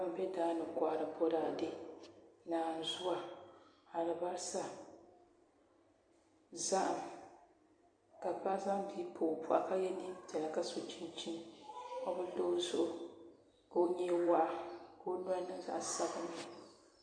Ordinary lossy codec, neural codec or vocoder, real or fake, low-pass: MP3, 48 kbps; vocoder, 44.1 kHz, 128 mel bands every 256 samples, BigVGAN v2; fake; 9.9 kHz